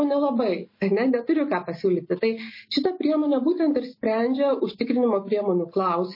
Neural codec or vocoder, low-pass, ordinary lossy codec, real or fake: none; 5.4 kHz; MP3, 24 kbps; real